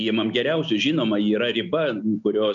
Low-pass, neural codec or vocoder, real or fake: 7.2 kHz; none; real